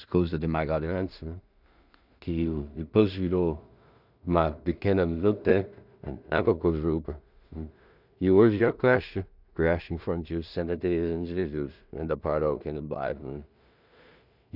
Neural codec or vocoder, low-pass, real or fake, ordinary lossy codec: codec, 16 kHz in and 24 kHz out, 0.4 kbps, LongCat-Audio-Codec, two codebook decoder; 5.4 kHz; fake; none